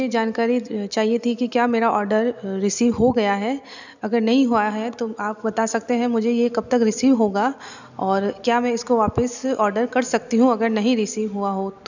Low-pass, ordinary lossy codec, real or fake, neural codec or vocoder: 7.2 kHz; none; real; none